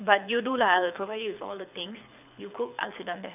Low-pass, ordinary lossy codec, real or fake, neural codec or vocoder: 3.6 kHz; none; fake; codec, 24 kHz, 6 kbps, HILCodec